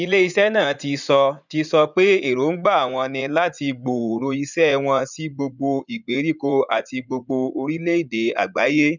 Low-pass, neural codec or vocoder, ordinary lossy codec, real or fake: 7.2 kHz; vocoder, 44.1 kHz, 80 mel bands, Vocos; none; fake